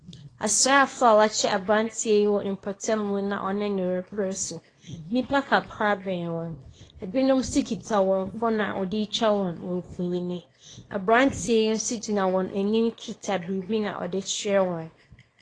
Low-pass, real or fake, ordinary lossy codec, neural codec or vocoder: 9.9 kHz; fake; AAC, 32 kbps; codec, 24 kHz, 0.9 kbps, WavTokenizer, small release